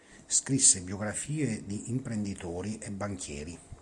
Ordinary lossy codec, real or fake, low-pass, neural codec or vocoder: AAC, 64 kbps; real; 10.8 kHz; none